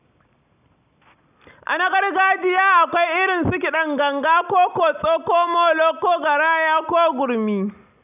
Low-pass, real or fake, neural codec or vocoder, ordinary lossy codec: 3.6 kHz; real; none; none